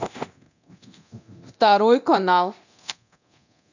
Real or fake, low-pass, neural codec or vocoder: fake; 7.2 kHz; codec, 24 kHz, 0.9 kbps, DualCodec